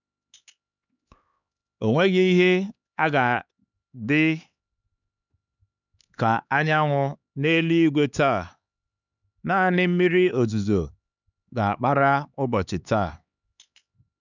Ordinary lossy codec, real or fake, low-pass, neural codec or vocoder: none; fake; 7.2 kHz; codec, 16 kHz, 4 kbps, X-Codec, HuBERT features, trained on LibriSpeech